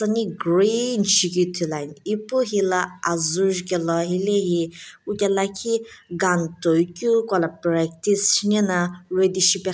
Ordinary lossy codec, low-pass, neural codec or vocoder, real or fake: none; none; none; real